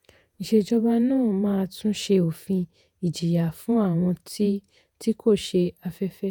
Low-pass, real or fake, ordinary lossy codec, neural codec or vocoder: none; fake; none; vocoder, 48 kHz, 128 mel bands, Vocos